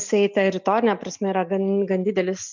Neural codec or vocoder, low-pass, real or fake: none; 7.2 kHz; real